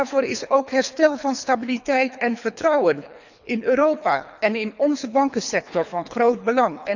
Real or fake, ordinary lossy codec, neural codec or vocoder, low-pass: fake; none; codec, 24 kHz, 3 kbps, HILCodec; 7.2 kHz